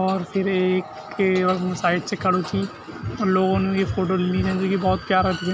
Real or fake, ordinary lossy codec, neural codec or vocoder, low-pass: real; none; none; none